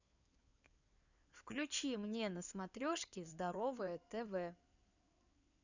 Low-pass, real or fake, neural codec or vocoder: 7.2 kHz; fake; codec, 16 kHz in and 24 kHz out, 2.2 kbps, FireRedTTS-2 codec